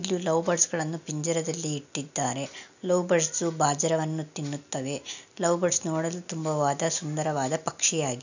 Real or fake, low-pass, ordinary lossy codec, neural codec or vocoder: real; 7.2 kHz; none; none